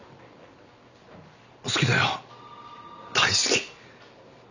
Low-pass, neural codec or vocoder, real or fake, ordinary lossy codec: 7.2 kHz; none; real; none